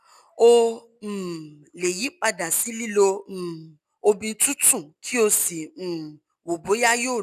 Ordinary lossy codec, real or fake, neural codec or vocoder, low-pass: none; real; none; 14.4 kHz